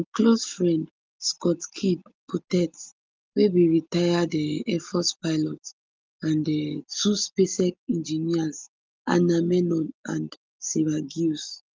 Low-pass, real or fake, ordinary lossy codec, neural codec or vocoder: 7.2 kHz; real; Opus, 32 kbps; none